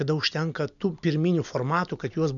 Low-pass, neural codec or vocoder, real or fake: 7.2 kHz; none; real